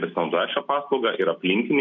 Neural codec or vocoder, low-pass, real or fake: none; 7.2 kHz; real